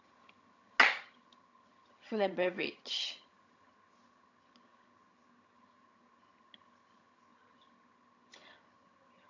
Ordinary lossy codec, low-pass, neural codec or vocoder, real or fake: AAC, 48 kbps; 7.2 kHz; vocoder, 22.05 kHz, 80 mel bands, HiFi-GAN; fake